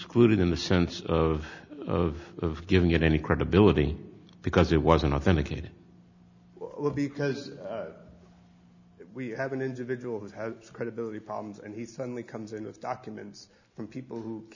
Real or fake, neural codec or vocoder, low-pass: real; none; 7.2 kHz